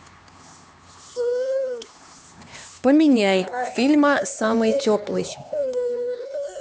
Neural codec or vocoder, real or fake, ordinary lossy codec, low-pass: codec, 16 kHz, 2 kbps, X-Codec, HuBERT features, trained on LibriSpeech; fake; none; none